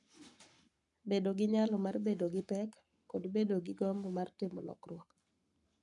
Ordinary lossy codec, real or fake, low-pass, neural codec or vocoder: none; fake; 10.8 kHz; codec, 44.1 kHz, 7.8 kbps, Pupu-Codec